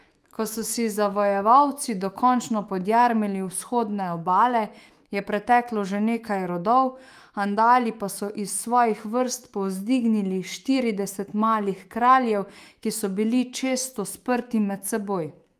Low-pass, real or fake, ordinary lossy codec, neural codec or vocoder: 14.4 kHz; fake; Opus, 32 kbps; autoencoder, 48 kHz, 128 numbers a frame, DAC-VAE, trained on Japanese speech